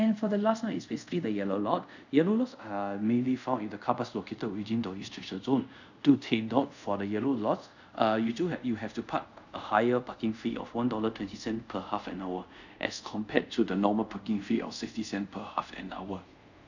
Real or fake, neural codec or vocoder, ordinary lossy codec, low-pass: fake; codec, 24 kHz, 0.5 kbps, DualCodec; none; 7.2 kHz